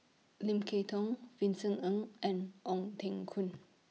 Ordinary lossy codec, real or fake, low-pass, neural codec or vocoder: none; real; none; none